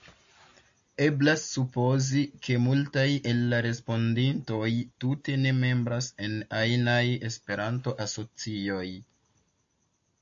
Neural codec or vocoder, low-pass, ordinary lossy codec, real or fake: none; 7.2 kHz; AAC, 64 kbps; real